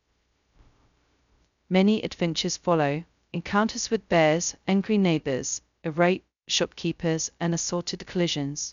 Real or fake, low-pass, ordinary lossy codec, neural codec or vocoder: fake; 7.2 kHz; none; codec, 16 kHz, 0.2 kbps, FocalCodec